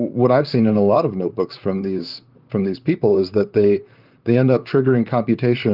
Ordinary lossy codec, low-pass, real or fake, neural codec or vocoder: Opus, 32 kbps; 5.4 kHz; fake; vocoder, 44.1 kHz, 128 mel bands, Pupu-Vocoder